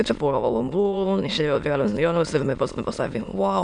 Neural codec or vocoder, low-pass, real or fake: autoencoder, 22.05 kHz, a latent of 192 numbers a frame, VITS, trained on many speakers; 9.9 kHz; fake